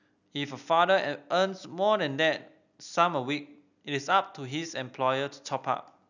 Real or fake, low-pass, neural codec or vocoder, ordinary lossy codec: real; 7.2 kHz; none; none